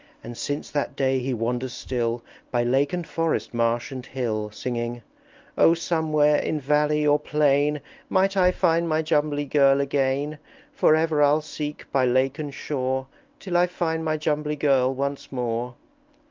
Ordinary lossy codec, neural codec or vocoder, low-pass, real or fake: Opus, 32 kbps; none; 7.2 kHz; real